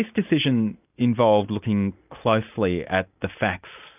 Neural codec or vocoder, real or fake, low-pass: none; real; 3.6 kHz